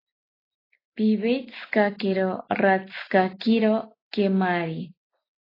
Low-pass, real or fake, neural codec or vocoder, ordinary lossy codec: 5.4 kHz; real; none; AAC, 24 kbps